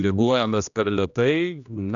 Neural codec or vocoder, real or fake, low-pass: codec, 16 kHz, 1 kbps, X-Codec, HuBERT features, trained on general audio; fake; 7.2 kHz